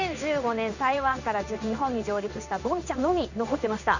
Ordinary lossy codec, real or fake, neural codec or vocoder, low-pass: none; fake; codec, 16 kHz in and 24 kHz out, 1 kbps, XY-Tokenizer; 7.2 kHz